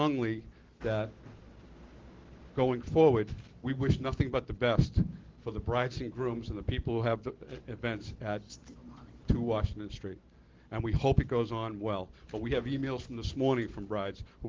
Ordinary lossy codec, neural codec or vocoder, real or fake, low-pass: Opus, 16 kbps; none; real; 7.2 kHz